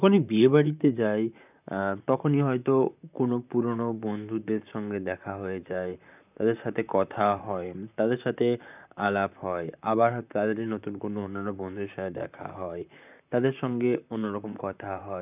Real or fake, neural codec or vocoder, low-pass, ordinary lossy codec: fake; vocoder, 44.1 kHz, 128 mel bands, Pupu-Vocoder; 3.6 kHz; none